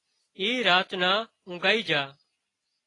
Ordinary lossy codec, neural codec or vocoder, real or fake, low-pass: AAC, 32 kbps; none; real; 10.8 kHz